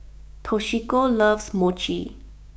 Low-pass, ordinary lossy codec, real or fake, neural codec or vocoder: none; none; fake; codec, 16 kHz, 6 kbps, DAC